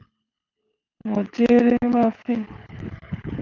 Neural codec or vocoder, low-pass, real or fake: codec, 24 kHz, 6 kbps, HILCodec; 7.2 kHz; fake